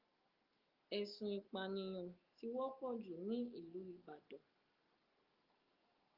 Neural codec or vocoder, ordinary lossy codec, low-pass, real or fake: none; Opus, 32 kbps; 5.4 kHz; real